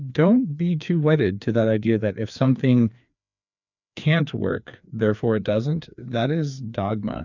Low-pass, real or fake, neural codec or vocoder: 7.2 kHz; fake; codec, 16 kHz, 2 kbps, FreqCodec, larger model